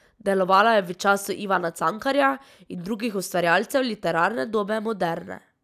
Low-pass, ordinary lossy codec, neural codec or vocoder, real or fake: 14.4 kHz; none; none; real